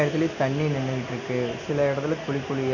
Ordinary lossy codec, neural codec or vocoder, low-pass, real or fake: none; none; 7.2 kHz; real